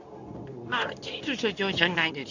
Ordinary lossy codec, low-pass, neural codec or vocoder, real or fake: none; 7.2 kHz; codec, 24 kHz, 0.9 kbps, WavTokenizer, medium speech release version 2; fake